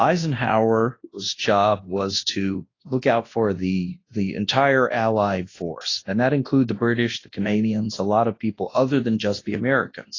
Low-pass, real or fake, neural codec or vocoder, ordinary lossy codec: 7.2 kHz; fake; codec, 24 kHz, 0.9 kbps, WavTokenizer, large speech release; AAC, 32 kbps